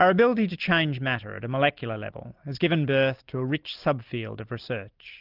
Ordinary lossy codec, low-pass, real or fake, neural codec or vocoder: Opus, 32 kbps; 5.4 kHz; real; none